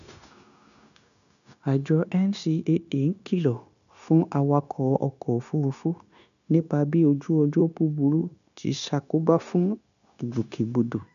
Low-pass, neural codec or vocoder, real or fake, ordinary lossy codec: 7.2 kHz; codec, 16 kHz, 0.9 kbps, LongCat-Audio-Codec; fake; none